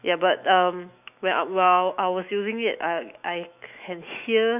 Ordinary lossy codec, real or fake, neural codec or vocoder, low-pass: none; fake; autoencoder, 48 kHz, 128 numbers a frame, DAC-VAE, trained on Japanese speech; 3.6 kHz